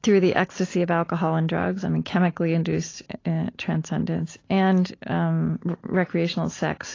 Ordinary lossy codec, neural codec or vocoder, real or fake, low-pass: AAC, 32 kbps; none; real; 7.2 kHz